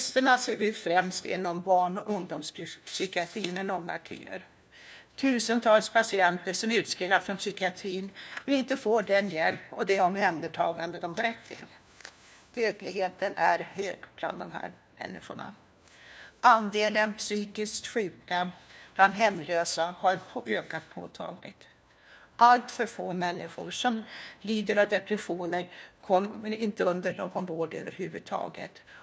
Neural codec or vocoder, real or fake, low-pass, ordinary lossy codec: codec, 16 kHz, 1 kbps, FunCodec, trained on LibriTTS, 50 frames a second; fake; none; none